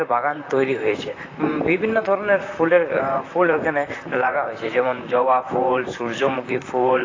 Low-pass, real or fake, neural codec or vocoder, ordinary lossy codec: 7.2 kHz; fake; vocoder, 44.1 kHz, 128 mel bands, Pupu-Vocoder; AAC, 32 kbps